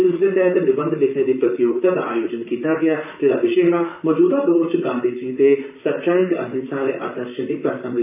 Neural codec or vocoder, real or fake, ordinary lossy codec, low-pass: vocoder, 44.1 kHz, 128 mel bands, Pupu-Vocoder; fake; none; 3.6 kHz